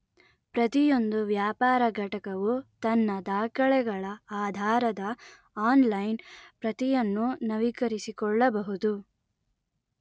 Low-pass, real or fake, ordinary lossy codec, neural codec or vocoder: none; real; none; none